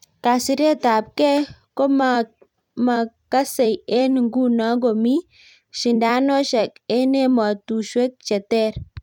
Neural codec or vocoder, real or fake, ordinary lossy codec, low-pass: vocoder, 44.1 kHz, 128 mel bands every 256 samples, BigVGAN v2; fake; none; 19.8 kHz